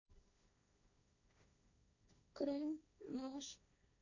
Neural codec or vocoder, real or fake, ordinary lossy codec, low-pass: codec, 16 kHz, 1.1 kbps, Voila-Tokenizer; fake; none; 7.2 kHz